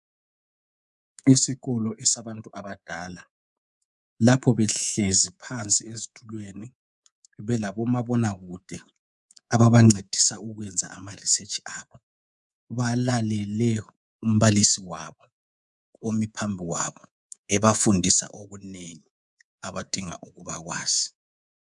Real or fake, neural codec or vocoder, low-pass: fake; codec, 24 kHz, 3.1 kbps, DualCodec; 10.8 kHz